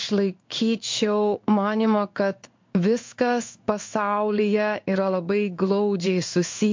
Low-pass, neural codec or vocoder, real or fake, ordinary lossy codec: 7.2 kHz; codec, 16 kHz in and 24 kHz out, 1 kbps, XY-Tokenizer; fake; MP3, 48 kbps